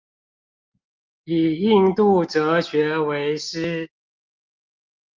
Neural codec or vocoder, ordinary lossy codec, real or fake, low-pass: none; Opus, 32 kbps; real; 7.2 kHz